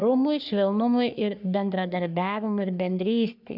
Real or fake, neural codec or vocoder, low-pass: fake; codec, 44.1 kHz, 3.4 kbps, Pupu-Codec; 5.4 kHz